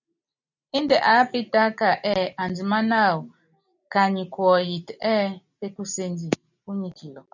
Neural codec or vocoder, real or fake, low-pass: none; real; 7.2 kHz